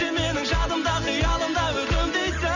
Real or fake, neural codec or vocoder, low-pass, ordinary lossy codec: real; none; 7.2 kHz; none